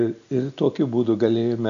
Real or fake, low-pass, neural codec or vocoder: real; 7.2 kHz; none